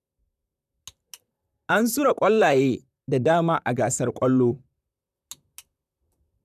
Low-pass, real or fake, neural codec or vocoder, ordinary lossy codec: 14.4 kHz; fake; vocoder, 44.1 kHz, 128 mel bands, Pupu-Vocoder; none